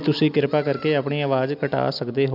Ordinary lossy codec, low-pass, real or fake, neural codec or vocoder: AAC, 48 kbps; 5.4 kHz; real; none